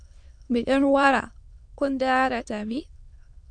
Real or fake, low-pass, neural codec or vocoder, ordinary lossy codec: fake; 9.9 kHz; autoencoder, 22.05 kHz, a latent of 192 numbers a frame, VITS, trained on many speakers; MP3, 64 kbps